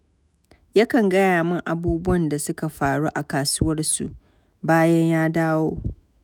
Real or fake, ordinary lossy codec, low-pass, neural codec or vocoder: fake; none; none; autoencoder, 48 kHz, 128 numbers a frame, DAC-VAE, trained on Japanese speech